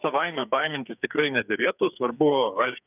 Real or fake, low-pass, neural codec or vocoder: fake; 3.6 kHz; codec, 44.1 kHz, 2.6 kbps, SNAC